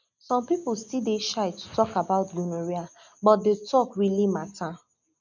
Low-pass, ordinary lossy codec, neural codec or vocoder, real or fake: 7.2 kHz; none; none; real